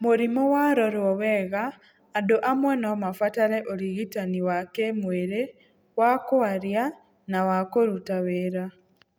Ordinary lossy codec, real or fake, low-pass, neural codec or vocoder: none; real; none; none